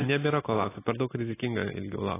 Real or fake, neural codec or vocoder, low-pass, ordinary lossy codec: real; none; 3.6 kHz; AAC, 16 kbps